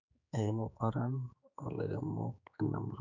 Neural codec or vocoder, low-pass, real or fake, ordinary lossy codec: codec, 16 kHz, 4 kbps, X-Codec, HuBERT features, trained on general audio; 7.2 kHz; fake; none